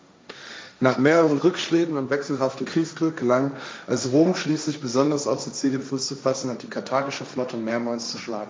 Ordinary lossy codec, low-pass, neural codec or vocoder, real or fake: none; none; codec, 16 kHz, 1.1 kbps, Voila-Tokenizer; fake